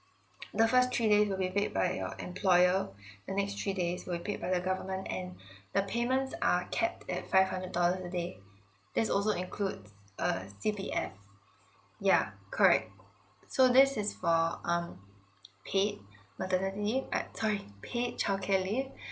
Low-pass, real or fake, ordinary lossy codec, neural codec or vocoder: none; real; none; none